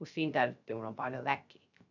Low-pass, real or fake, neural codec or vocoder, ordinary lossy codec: 7.2 kHz; fake; codec, 16 kHz, 0.3 kbps, FocalCodec; none